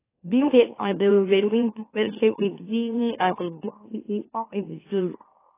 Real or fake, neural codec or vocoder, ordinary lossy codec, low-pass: fake; autoencoder, 44.1 kHz, a latent of 192 numbers a frame, MeloTTS; AAC, 16 kbps; 3.6 kHz